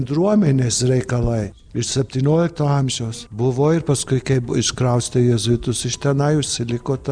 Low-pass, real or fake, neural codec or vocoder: 9.9 kHz; real; none